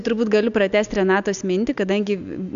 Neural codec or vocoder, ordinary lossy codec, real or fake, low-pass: none; MP3, 64 kbps; real; 7.2 kHz